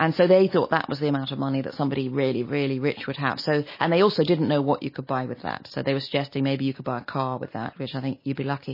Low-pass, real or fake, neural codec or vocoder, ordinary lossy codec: 5.4 kHz; real; none; MP3, 24 kbps